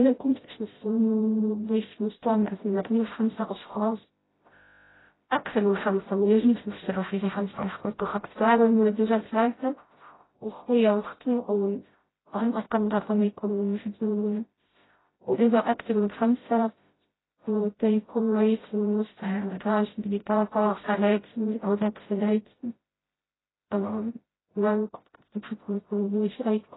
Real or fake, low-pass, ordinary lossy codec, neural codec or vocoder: fake; 7.2 kHz; AAC, 16 kbps; codec, 16 kHz, 0.5 kbps, FreqCodec, smaller model